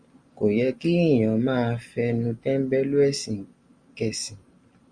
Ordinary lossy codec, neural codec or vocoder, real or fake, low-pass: Opus, 64 kbps; none; real; 9.9 kHz